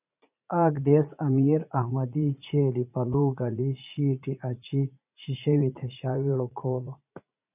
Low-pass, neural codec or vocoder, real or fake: 3.6 kHz; vocoder, 44.1 kHz, 80 mel bands, Vocos; fake